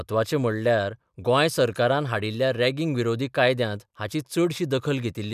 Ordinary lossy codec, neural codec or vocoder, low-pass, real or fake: none; none; 14.4 kHz; real